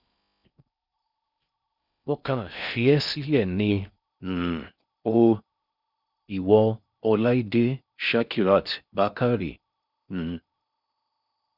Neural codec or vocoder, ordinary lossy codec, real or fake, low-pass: codec, 16 kHz in and 24 kHz out, 0.6 kbps, FocalCodec, streaming, 4096 codes; none; fake; 5.4 kHz